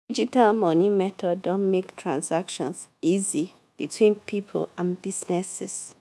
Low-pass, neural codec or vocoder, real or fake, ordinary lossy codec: none; codec, 24 kHz, 1.2 kbps, DualCodec; fake; none